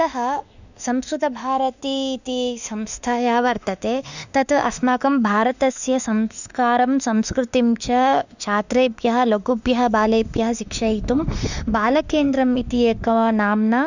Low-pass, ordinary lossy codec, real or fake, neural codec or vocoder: 7.2 kHz; none; fake; autoencoder, 48 kHz, 32 numbers a frame, DAC-VAE, trained on Japanese speech